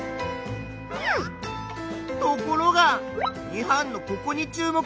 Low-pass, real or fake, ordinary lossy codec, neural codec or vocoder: none; real; none; none